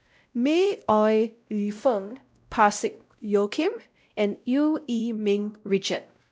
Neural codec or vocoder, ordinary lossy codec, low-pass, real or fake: codec, 16 kHz, 0.5 kbps, X-Codec, WavLM features, trained on Multilingual LibriSpeech; none; none; fake